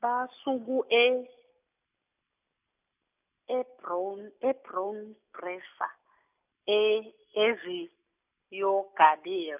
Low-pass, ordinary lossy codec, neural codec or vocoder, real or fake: 3.6 kHz; none; none; real